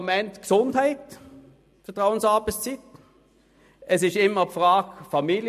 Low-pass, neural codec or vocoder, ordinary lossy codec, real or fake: 14.4 kHz; none; MP3, 64 kbps; real